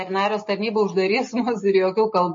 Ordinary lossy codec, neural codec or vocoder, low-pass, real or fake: MP3, 32 kbps; none; 7.2 kHz; real